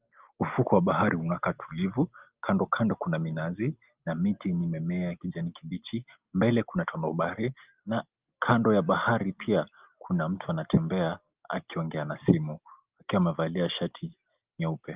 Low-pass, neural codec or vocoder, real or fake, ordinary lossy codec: 3.6 kHz; none; real; Opus, 32 kbps